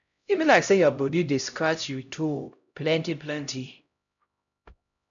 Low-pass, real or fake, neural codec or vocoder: 7.2 kHz; fake; codec, 16 kHz, 0.5 kbps, X-Codec, HuBERT features, trained on LibriSpeech